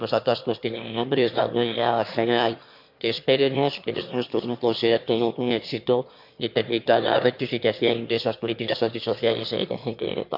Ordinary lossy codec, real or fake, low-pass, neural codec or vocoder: MP3, 48 kbps; fake; 5.4 kHz; autoencoder, 22.05 kHz, a latent of 192 numbers a frame, VITS, trained on one speaker